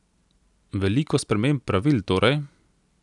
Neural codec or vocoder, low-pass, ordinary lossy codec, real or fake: none; 10.8 kHz; none; real